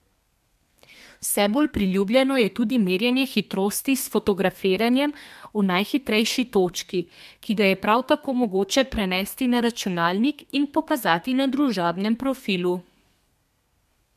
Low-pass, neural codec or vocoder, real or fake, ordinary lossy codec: 14.4 kHz; codec, 32 kHz, 1.9 kbps, SNAC; fake; MP3, 96 kbps